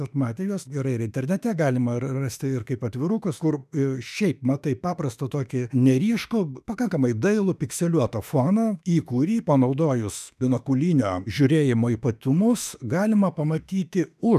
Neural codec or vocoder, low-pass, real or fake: autoencoder, 48 kHz, 32 numbers a frame, DAC-VAE, trained on Japanese speech; 14.4 kHz; fake